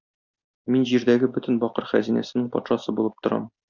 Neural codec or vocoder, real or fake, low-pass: none; real; 7.2 kHz